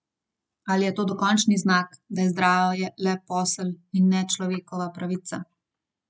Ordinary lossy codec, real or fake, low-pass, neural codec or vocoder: none; real; none; none